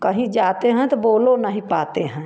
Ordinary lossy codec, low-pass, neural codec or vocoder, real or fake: none; none; none; real